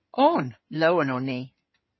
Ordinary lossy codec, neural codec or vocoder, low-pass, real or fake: MP3, 24 kbps; codec, 16 kHz in and 24 kHz out, 2.2 kbps, FireRedTTS-2 codec; 7.2 kHz; fake